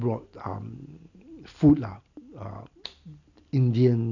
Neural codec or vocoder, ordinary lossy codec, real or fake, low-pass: none; none; real; 7.2 kHz